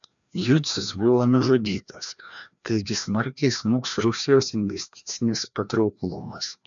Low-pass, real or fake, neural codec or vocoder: 7.2 kHz; fake; codec, 16 kHz, 1 kbps, FreqCodec, larger model